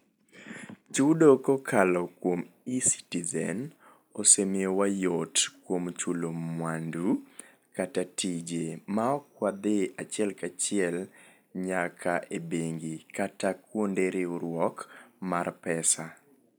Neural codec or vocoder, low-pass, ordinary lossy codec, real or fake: none; none; none; real